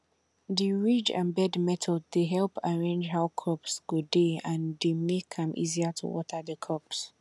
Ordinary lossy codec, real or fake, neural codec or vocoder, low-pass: none; real; none; none